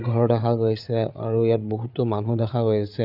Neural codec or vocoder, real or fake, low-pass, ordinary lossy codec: codec, 16 kHz, 8 kbps, FreqCodec, larger model; fake; 5.4 kHz; none